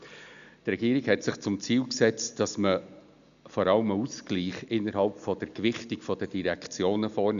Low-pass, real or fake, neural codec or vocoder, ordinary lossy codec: 7.2 kHz; real; none; none